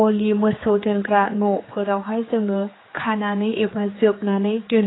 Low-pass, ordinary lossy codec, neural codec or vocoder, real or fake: 7.2 kHz; AAC, 16 kbps; codec, 16 kHz, 4 kbps, X-Codec, HuBERT features, trained on general audio; fake